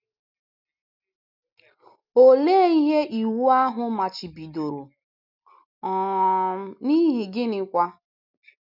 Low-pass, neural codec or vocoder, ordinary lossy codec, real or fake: 5.4 kHz; none; none; real